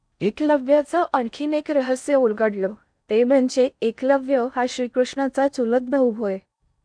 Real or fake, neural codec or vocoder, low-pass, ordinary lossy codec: fake; codec, 16 kHz in and 24 kHz out, 0.6 kbps, FocalCodec, streaming, 4096 codes; 9.9 kHz; none